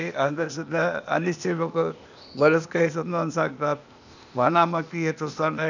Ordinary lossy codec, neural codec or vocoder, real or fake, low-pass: none; codec, 16 kHz, 0.8 kbps, ZipCodec; fake; 7.2 kHz